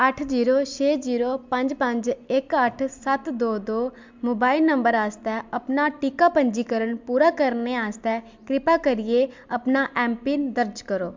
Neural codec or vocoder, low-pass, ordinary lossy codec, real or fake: none; 7.2 kHz; MP3, 64 kbps; real